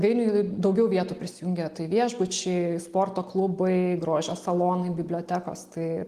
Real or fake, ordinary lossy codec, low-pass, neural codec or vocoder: real; Opus, 24 kbps; 14.4 kHz; none